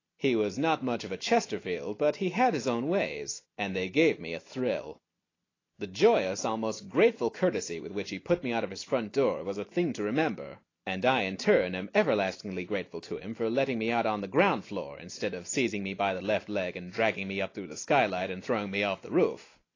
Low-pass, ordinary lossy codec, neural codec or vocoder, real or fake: 7.2 kHz; AAC, 32 kbps; none; real